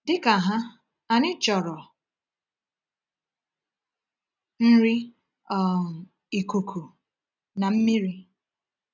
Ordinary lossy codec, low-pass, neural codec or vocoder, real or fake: none; 7.2 kHz; none; real